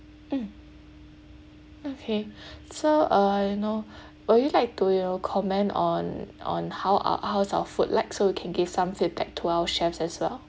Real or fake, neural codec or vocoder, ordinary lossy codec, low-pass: real; none; none; none